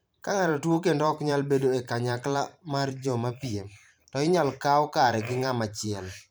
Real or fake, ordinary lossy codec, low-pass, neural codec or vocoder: fake; none; none; vocoder, 44.1 kHz, 128 mel bands every 512 samples, BigVGAN v2